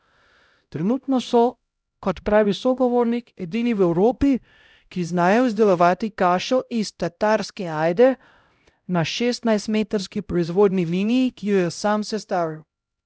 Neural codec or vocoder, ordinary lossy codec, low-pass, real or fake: codec, 16 kHz, 0.5 kbps, X-Codec, HuBERT features, trained on LibriSpeech; none; none; fake